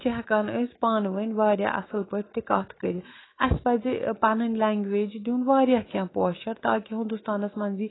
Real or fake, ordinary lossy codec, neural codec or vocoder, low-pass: fake; AAC, 16 kbps; autoencoder, 48 kHz, 128 numbers a frame, DAC-VAE, trained on Japanese speech; 7.2 kHz